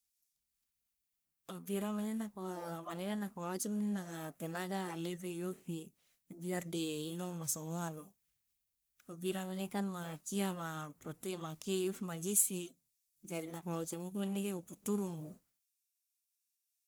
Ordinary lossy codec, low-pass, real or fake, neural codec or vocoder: none; none; fake; codec, 44.1 kHz, 1.7 kbps, Pupu-Codec